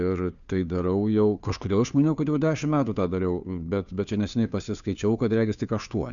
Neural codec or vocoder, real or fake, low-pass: codec, 16 kHz, 2 kbps, FunCodec, trained on Chinese and English, 25 frames a second; fake; 7.2 kHz